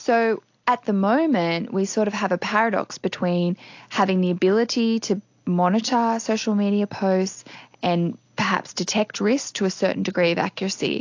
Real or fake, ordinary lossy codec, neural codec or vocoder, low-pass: real; AAC, 48 kbps; none; 7.2 kHz